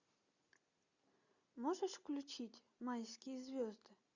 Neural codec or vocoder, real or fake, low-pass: none; real; 7.2 kHz